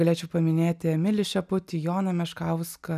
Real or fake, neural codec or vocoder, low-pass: real; none; 14.4 kHz